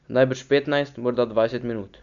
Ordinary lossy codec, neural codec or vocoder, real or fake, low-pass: none; none; real; 7.2 kHz